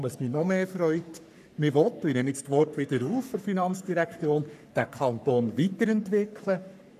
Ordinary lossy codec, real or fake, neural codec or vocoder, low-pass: none; fake; codec, 44.1 kHz, 3.4 kbps, Pupu-Codec; 14.4 kHz